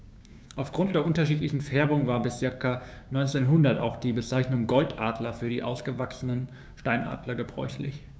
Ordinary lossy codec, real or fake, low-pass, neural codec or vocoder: none; fake; none; codec, 16 kHz, 6 kbps, DAC